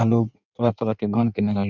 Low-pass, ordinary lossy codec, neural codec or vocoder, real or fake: 7.2 kHz; none; codec, 16 kHz in and 24 kHz out, 1.1 kbps, FireRedTTS-2 codec; fake